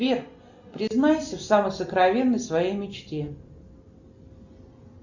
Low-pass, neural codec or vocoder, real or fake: 7.2 kHz; none; real